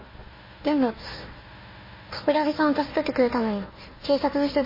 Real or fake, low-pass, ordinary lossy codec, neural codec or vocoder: fake; 5.4 kHz; MP3, 24 kbps; codec, 16 kHz, 1 kbps, FunCodec, trained on Chinese and English, 50 frames a second